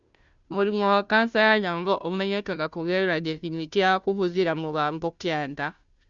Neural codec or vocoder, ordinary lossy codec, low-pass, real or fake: codec, 16 kHz, 0.5 kbps, FunCodec, trained on Chinese and English, 25 frames a second; Opus, 64 kbps; 7.2 kHz; fake